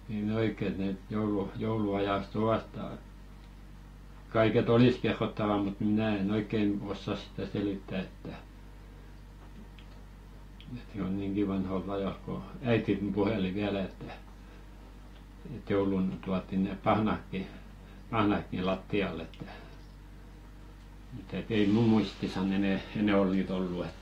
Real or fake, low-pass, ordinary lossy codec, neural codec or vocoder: real; 19.8 kHz; AAC, 48 kbps; none